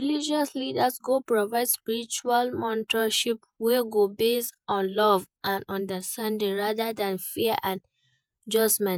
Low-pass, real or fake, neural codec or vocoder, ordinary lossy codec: none; fake; vocoder, 48 kHz, 128 mel bands, Vocos; none